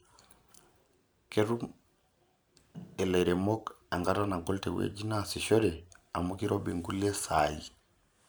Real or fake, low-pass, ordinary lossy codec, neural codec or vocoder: fake; none; none; vocoder, 44.1 kHz, 128 mel bands every 512 samples, BigVGAN v2